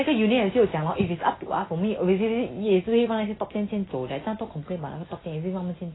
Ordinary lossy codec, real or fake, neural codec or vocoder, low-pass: AAC, 16 kbps; real; none; 7.2 kHz